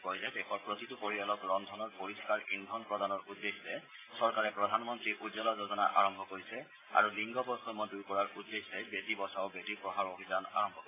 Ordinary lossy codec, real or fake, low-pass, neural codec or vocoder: AAC, 16 kbps; real; 3.6 kHz; none